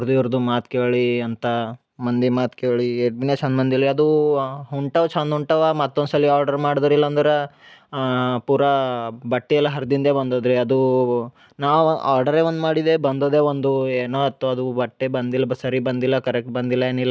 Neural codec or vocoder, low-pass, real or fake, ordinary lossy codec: none; none; real; none